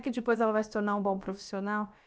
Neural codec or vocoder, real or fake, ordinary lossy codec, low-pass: codec, 16 kHz, about 1 kbps, DyCAST, with the encoder's durations; fake; none; none